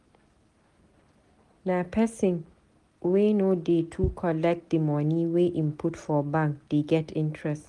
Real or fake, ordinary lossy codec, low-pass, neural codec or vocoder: real; Opus, 24 kbps; 10.8 kHz; none